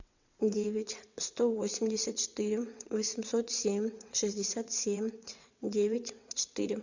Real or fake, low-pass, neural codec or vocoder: fake; 7.2 kHz; vocoder, 22.05 kHz, 80 mel bands, WaveNeXt